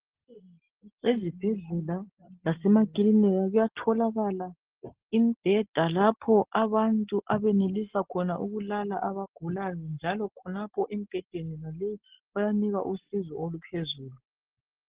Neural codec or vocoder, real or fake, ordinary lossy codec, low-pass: none; real; Opus, 16 kbps; 3.6 kHz